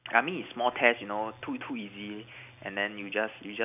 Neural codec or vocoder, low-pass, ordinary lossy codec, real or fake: none; 3.6 kHz; none; real